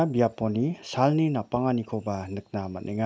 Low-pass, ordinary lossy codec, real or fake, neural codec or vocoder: none; none; real; none